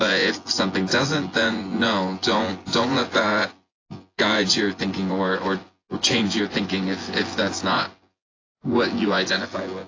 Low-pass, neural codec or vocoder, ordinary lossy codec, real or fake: 7.2 kHz; vocoder, 24 kHz, 100 mel bands, Vocos; AAC, 32 kbps; fake